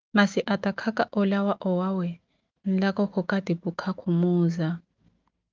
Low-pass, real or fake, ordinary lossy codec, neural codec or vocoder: 7.2 kHz; fake; Opus, 32 kbps; autoencoder, 48 kHz, 128 numbers a frame, DAC-VAE, trained on Japanese speech